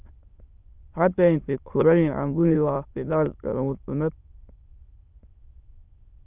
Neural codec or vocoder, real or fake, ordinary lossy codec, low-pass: autoencoder, 22.05 kHz, a latent of 192 numbers a frame, VITS, trained on many speakers; fake; Opus, 32 kbps; 3.6 kHz